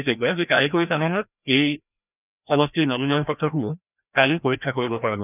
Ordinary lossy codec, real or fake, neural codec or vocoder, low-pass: none; fake; codec, 16 kHz, 1 kbps, FreqCodec, larger model; 3.6 kHz